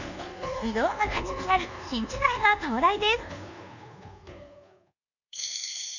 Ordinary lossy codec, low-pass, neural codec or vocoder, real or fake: none; 7.2 kHz; codec, 24 kHz, 1.2 kbps, DualCodec; fake